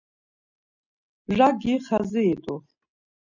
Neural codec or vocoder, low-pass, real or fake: none; 7.2 kHz; real